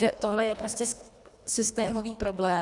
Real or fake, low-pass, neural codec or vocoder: fake; 10.8 kHz; codec, 24 kHz, 1.5 kbps, HILCodec